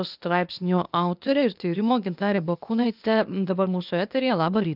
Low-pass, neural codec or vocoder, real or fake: 5.4 kHz; codec, 16 kHz, 0.8 kbps, ZipCodec; fake